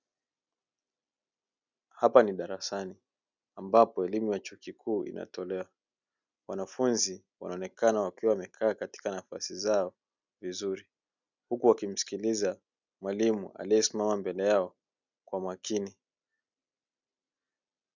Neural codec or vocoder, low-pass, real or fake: none; 7.2 kHz; real